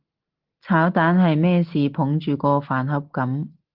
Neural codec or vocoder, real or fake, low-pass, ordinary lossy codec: none; real; 5.4 kHz; Opus, 16 kbps